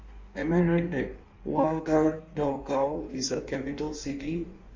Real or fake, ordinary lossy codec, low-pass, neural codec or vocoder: fake; MP3, 64 kbps; 7.2 kHz; codec, 16 kHz in and 24 kHz out, 1.1 kbps, FireRedTTS-2 codec